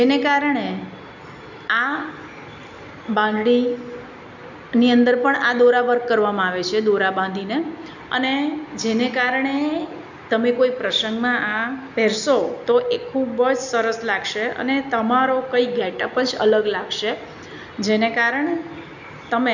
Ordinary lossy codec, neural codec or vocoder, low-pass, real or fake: none; none; 7.2 kHz; real